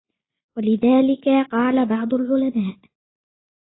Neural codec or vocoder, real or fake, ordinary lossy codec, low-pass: none; real; AAC, 16 kbps; 7.2 kHz